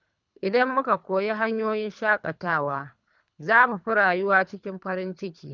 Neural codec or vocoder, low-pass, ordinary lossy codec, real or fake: codec, 24 kHz, 3 kbps, HILCodec; 7.2 kHz; none; fake